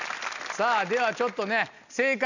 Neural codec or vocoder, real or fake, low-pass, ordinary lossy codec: none; real; 7.2 kHz; none